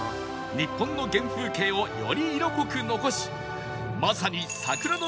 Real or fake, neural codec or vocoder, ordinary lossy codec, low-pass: real; none; none; none